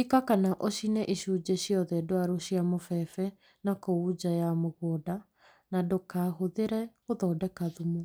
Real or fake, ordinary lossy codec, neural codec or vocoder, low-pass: real; none; none; none